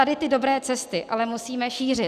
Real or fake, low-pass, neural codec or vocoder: real; 14.4 kHz; none